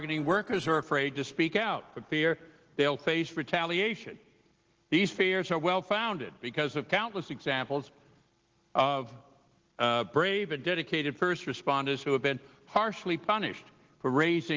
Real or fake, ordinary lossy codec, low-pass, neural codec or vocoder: real; Opus, 16 kbps; 7.2 kHz; none